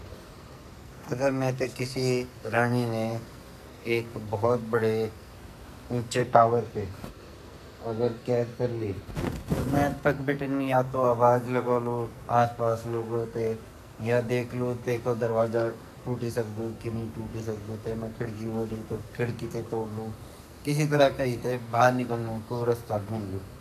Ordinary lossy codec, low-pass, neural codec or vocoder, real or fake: none; 14.4 kHz; codec, 44.1 kHz, 2.6 kbps, SNAC; fake